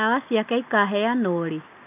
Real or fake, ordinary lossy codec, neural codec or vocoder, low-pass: real; none; none; 3.6 kHz